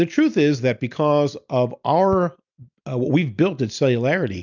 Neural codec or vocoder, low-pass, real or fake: none; 7.2 kHz; real